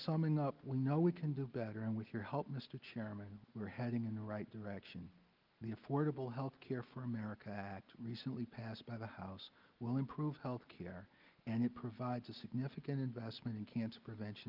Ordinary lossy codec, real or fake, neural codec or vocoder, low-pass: Opus, 32 kbps; real; none; 5.4 kHz